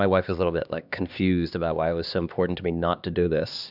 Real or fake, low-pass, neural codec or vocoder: fake; 5.4 kHz; codec, 16 kHz, 2 kbps, X-Codec, HuBERT features, trained on LibriSpeech